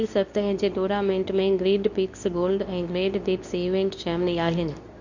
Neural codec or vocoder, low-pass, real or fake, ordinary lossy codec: codec, 24 kHz, 0.9 kbps, WavTokenizer, medium speech release version 2; 7.2 kHz; fake; none